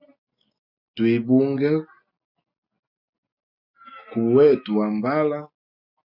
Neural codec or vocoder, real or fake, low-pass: none; real; 5.4 kHz